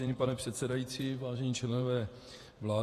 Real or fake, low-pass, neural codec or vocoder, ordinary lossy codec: fake; 14.4 kHz; vocoder, 48 kHz, 128 mel bands, Vocos; AAC, 48 kbps